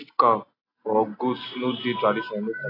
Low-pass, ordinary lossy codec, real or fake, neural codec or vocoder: 5.4 kHz; AAC, 24 kbps; real; none